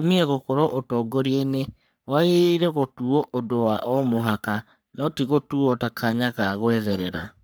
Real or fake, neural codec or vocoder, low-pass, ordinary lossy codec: fake; codec, 44.1 kHz, 3.4 kbps, Pupu-Codec; none; none